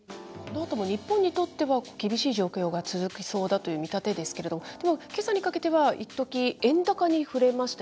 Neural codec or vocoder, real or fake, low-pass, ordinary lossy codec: none; real; none; none